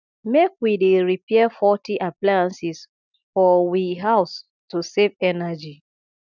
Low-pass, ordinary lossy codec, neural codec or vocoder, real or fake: 7.2 kHz; none; none; real